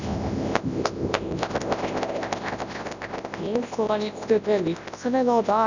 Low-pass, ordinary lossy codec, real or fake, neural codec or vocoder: 7.2 kHz; none; fake; codec, 24 kHz, 0.9 kbps, WavTokenizer, large speech release